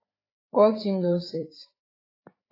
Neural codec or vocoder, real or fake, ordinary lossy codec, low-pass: codec, 16 kHz, 4 kbps, FreqCodec, larger model; fake; AAC, 24 kbps; 5.4 kHz